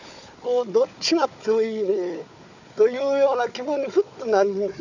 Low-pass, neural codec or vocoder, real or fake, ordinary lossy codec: 7.2 kHz; codec, 16 kHz, 4 kbps, FunCodec, trained on Chinese and English, 50 frames a second; fake; none